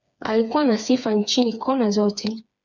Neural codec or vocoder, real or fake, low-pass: codec, 16 kHz, 4 kbps, FreqCodec, smaller model; fake; 7.2 kHz